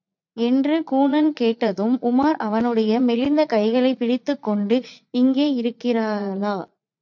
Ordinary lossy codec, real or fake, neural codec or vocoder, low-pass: MP3, 64 kbps; fake; vocoder, 44.1 kHz, 80 mel bands, Vocos; 7.2 kHz